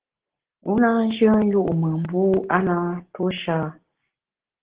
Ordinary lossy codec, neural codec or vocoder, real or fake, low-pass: Opus, 16 kbps; none; real; 3.6 kHz